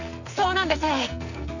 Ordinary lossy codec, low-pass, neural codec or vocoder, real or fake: none; 7.2 kHz; codec, 44.1 kHz, 2.6 kbps, SNAC; fake